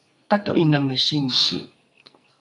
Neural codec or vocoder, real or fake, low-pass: codec, 44.1 kHz, 2.6 kbps, SNAC; fake; 10.8 kHz